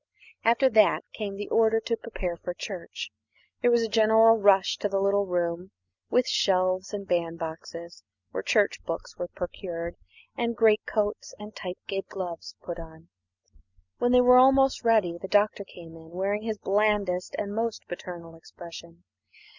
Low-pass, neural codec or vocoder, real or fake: 7.2 kHz; none; real